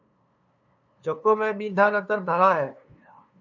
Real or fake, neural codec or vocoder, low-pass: fake; codec, 16 kHz, 2 kbps, FunCodec, trained on LibriTTS, 25 frames a second; 7.2 kHz